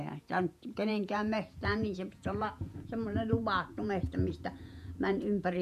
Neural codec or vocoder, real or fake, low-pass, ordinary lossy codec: vocoder, 48 kHz, 128 mel bands, Vocos; fake; 14.4 kHz; MP3, 96 kbps